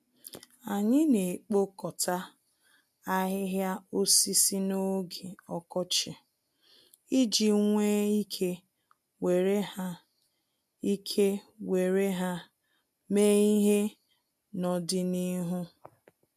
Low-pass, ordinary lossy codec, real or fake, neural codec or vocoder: 14.4 kHz; MP3, 96 kbps; real; none